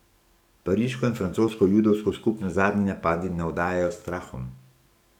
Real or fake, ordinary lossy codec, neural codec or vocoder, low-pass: fake; none; codec, 44.1 kHz, 7.8 kbps, DAC; 19.8 kHz